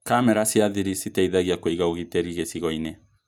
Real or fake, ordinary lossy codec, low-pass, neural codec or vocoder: real; none; none; none